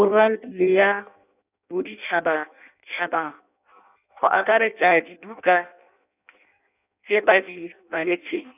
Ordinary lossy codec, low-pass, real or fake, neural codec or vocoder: none; 3.6 kHz; fake; codec, 16 kHz in and 24 kHz out, 0.6 kbps, FireRedTTS-2 codec